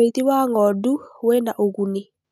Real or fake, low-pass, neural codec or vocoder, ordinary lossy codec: real; 14.4 kHz; none; none